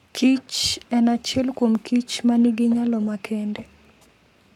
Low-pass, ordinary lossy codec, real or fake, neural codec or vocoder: 19.8 kHz; none; fake; codec, 44.1 kHz, 7.8 kbps, Pupu-Codec